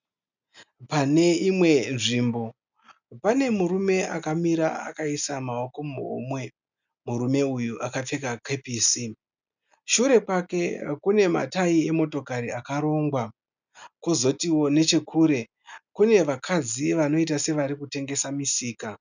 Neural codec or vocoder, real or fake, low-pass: none; real; 7.2 kHz